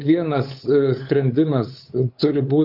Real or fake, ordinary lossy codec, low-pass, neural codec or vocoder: fake; AAC, 48 kbps; 5.4 kHz; codec, 16 kHz, 8 kbps, FunCodec, trained on LibriTTS, 25 frames a second